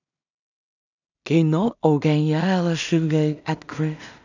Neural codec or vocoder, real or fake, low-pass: codec, 16 kHz in and 24 kHz out, 0.4 kbps, LongCat-Audio-Codec, two codebook decoder; fake; 7.2 kHz